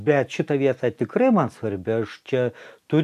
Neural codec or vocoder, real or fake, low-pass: none; real; 14.4 kHz